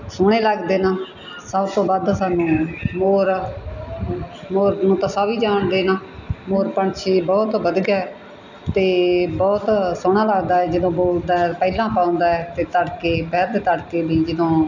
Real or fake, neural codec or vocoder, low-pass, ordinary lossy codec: real; none; 7.2 kHz; none